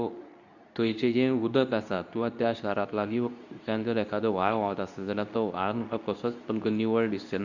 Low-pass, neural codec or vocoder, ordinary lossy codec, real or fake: 7.2 kHz; codec, 24 kHz, 0.9 kbps, WavTokenizer, medium speech release version 2; none; fake